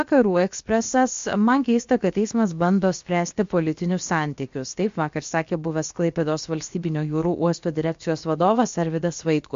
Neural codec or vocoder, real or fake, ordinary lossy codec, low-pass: codec, 16 kHz, about 1 kbps, DyCAST, with the encoder's durations; fake; MP3, 48 kbps; 7.2 kHz